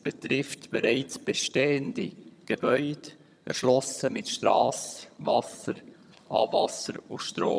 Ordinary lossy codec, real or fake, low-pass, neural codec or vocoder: none; fake; none; vocoder, 22.05 kHz, 80 mel bands, HiFi-GAN